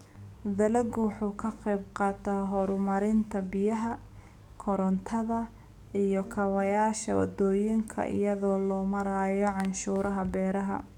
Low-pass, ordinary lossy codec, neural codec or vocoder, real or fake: 19.8 kHz; none; codec, 44.1 kHz, 7.8 kbps, DAC; fake